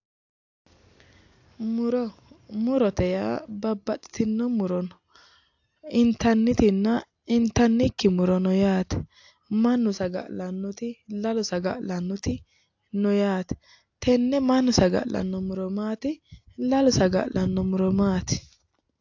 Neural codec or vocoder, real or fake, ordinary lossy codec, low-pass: none; real; AAC, 48 kbps; 7.2 kHz